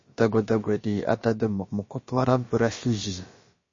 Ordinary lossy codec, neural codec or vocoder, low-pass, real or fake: MP3, 32 kbps; codec, 16 kHz, about 1 kbps, DyCAST, with the encoder's durations; 7.2 kHz; fake